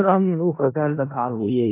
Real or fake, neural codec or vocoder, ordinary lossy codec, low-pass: fake; codec, 16 kHz in and 24 kHz out, 0.4 kbps, LongCat-Audio-Codec, four codebook decoder; AAC, 24 kbps; 3.6 kHz